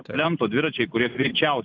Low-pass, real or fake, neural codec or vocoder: 7.2 kHz; fake; vocoder, 44.1 kHz, 128 mel bands every 256 samples, BigVGAN v2